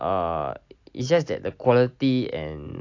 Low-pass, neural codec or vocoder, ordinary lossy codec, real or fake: 7.2 kHz; none; AAC, 48 kbps; real